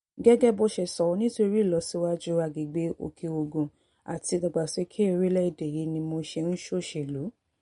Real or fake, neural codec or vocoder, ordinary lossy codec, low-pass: real; none; MP3, 48 kbps; 10.8 kHz